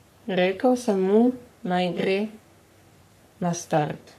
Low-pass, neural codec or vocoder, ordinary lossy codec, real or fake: 14.4 kHz; codec, 44.1 kHz, 3.4 kbps, Pupu-Codec; none; fake